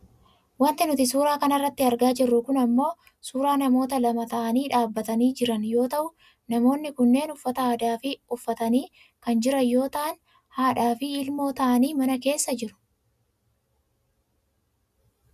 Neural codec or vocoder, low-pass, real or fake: none; 14.4 kHz; real